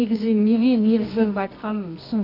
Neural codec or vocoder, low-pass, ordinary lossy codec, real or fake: codec, 24 kHz, 0.9 kbps, WavTokenizer, medium music audio release; 5.4 kHz; none; fake